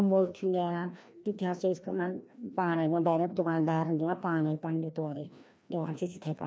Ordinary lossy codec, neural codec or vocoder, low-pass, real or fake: none; codec, 16 kHz, 1 kbps, FreqCodec, larger model; none; fake